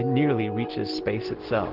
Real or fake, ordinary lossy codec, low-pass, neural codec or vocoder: real; Opus, 16 kbps; 5.4 kHz; none